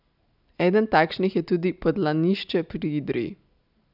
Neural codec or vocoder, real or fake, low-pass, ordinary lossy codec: none; real; 5.4 kHz; none